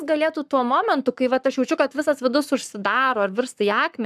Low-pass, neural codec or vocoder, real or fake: 14.4 kHz; codec, 44.1 kHz, 7.8 kbps, Pupu-Codec; fake